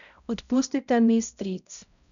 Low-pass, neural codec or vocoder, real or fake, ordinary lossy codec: 7.2 kHz; codec, 16 kHz, 0.5 kbps, X-Codec, HuBERT features, trained on balanced general audio; fake; MP3, 96 kbps